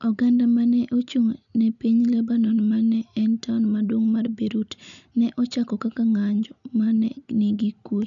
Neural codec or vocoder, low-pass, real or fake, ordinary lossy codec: none; 7.2 kHz; real; none